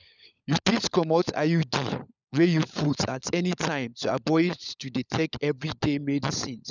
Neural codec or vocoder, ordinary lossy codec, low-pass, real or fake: codec, 16 kHz, 16 kbps, FunCodec, trained on LibriTTS, 50 frames a second; none; 7.2 kHz; fake